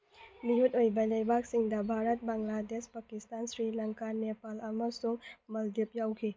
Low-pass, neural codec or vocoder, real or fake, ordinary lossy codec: none; none; real; none